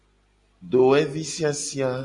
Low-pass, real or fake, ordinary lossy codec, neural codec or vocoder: 10.8 kHz; real; MP3, 48 kbps; none